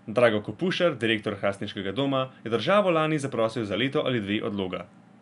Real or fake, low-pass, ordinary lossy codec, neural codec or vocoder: real; 10.8 kHz; none; none